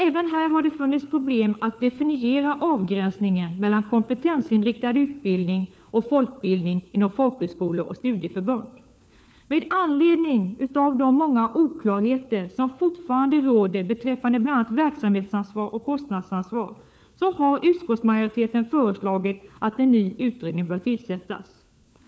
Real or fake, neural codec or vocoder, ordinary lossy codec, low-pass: fake; codec, 16 kHz, 4 kbps, FunCodec, trained on LibriTTS, 50 frames a second; none; none